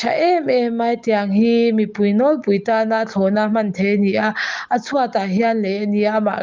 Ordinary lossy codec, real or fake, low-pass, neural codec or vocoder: Opus, 24 kbps; real; 7.2 kHz; none